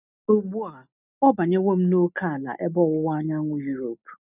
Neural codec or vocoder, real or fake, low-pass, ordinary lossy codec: none; real; 3.6 kHz; none